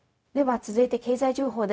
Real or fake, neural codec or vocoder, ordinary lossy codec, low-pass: fake; codec, 16 kHz, 0.4 kbps, LongCat-Audio-Codec; none; none